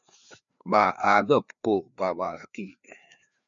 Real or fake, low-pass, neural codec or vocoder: fake; 7.2 kHz; codec, 16 kHz, 2 kbps, FreqCodec, larger model